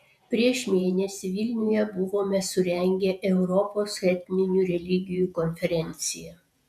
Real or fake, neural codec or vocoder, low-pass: fake; vocoder, 48 kHz, 128 mel bands, Vocos; 14.4 kHz